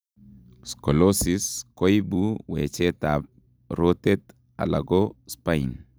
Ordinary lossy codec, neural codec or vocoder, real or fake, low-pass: none; none; real; none